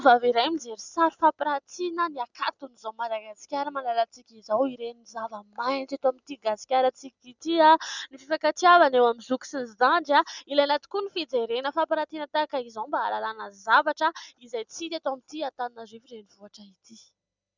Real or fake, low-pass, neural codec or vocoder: real; 7.2 kHz; none